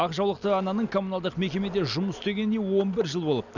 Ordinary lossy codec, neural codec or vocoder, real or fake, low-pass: none; none; real; 7.2 kHz